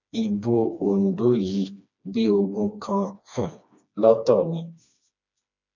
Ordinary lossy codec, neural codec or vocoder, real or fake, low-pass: none; codec, 16 kHz, 2 kbps, FreqCodec, smaller model; fake; 7.2 kHz